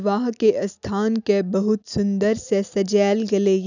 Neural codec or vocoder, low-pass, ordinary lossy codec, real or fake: none; 7.2 kHz; none; real